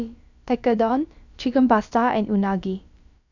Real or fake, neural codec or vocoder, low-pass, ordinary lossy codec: fake; codec, 16 kHz, about 1 kbps, DyCAST, with the encoder's durations; 7.2 kHz; none